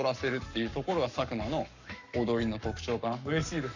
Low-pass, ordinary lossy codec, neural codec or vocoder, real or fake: 7.2 kHz; none; codec, 44.1 kHz, 7.8 kbps, Pupu-Codec; fake